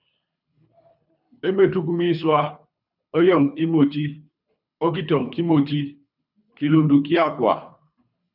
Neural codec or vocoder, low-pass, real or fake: codec, 24 kHz, 3 kbps, HILCodec; 5.4 kHz; fake